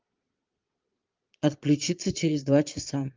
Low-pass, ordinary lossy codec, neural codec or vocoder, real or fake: 7.2 kHz; Opus, 32 kbps; vocoder, 22.05 kHz, 80 mel bands, Vocos; fake